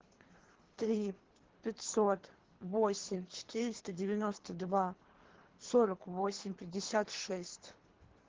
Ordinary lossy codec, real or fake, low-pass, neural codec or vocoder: Opus, 16 kbps; fake; 7.2 kHz; codec, 24 kHz, 3 kbps, HILCodec